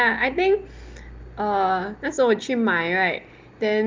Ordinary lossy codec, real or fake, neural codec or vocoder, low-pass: Opus, 32 kbps; real; none; 7.2 kHz